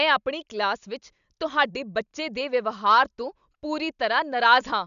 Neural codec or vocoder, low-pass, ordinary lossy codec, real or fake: none; 7.2 kHz; none; real